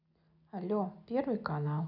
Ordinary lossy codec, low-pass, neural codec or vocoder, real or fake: none; 5.4 kHz; none; real